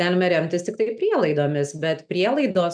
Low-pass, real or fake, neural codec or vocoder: 9.9 kHz; real; none